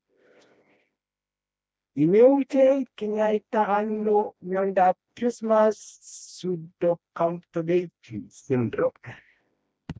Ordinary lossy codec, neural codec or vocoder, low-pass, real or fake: none; codec, 16 kHz, 1 kbps, FreqCodec, smaller model; none; fake